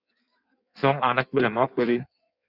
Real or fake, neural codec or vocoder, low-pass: fake; codec, 16 kHz in and 24 kHz out, 1.1 kbps, FireRedTTS-2 codec; 5.4 kHz